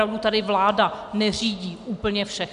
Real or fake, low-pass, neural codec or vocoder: real; 10.8 kHz; none